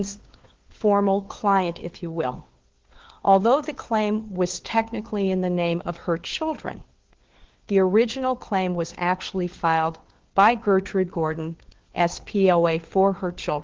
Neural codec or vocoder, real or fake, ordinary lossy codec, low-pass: codec, 16 kHz, 4 kbps, FunCodec, trained on LibriTTS, 50 frames a second; fake; Opus, 16 kbps; 7.2 kHz